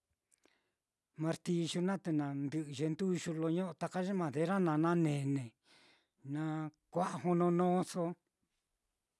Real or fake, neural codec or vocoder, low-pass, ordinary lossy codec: real; none; none; none